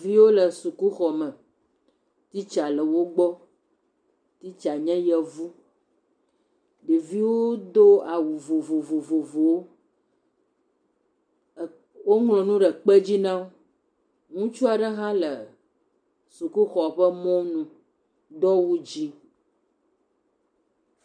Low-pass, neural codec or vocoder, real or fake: 9.9 kHz; none; real